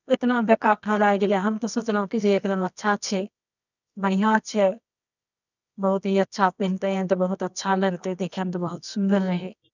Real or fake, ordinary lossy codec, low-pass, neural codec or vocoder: fake; none; 7.2 kHz; codec, 24 kHz, 0.9 kbps, WavTokenizer, medium music audio release